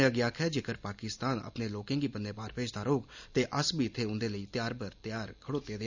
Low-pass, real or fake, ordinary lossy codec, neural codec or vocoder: 7.2 kHz; real; none; none